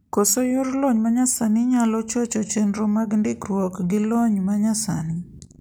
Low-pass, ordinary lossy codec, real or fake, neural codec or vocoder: none; none; real; none